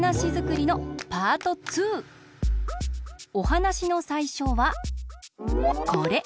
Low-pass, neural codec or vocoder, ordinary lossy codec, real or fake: none; none; none; real